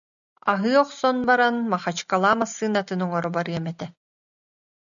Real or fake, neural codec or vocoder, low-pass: real; none; 7.2 kHz